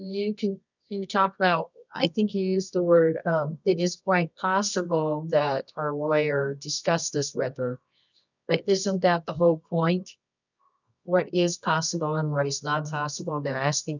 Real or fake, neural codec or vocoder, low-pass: fake; codec, 24 kHz, 0.9 kbps, WavTokenizer, medium music audio release; 7.2 kHz